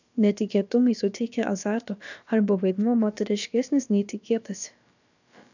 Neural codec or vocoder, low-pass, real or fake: codec, 16 kHz, about 1 kbps, DyCAST, with the encoder's durations; 7.2 kHz; fake